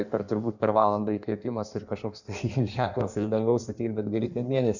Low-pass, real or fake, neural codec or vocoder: 7.2 kHz; fake; codec, 16 kHz in and 24 kHz out, 1.1 kbps, FireRedTTS-2 codec